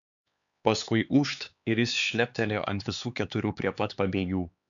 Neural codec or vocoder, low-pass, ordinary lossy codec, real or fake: codec, 16 kHz, 4 kbps, X-Codec, HuBERT features, trained on LibriSpeech; 7.2 kHz; MP3, 96 kbps; fake